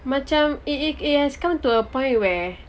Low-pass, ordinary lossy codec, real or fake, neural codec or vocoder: none; none; real; none